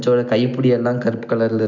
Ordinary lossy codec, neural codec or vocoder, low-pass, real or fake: none; none; 7.2 kHz; real